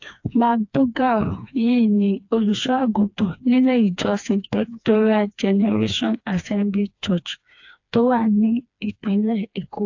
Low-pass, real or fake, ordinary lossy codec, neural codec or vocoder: 7.2 kHz; fake; AAC, 48 kbps; codec, 16 kHz, 2 kbps, FreqCodec, smaller model